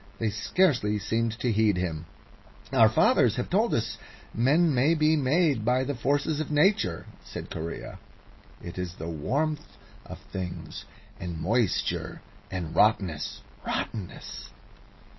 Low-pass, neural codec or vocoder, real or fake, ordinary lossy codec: 7.2 kHz; none; real; MP3, 24 kbps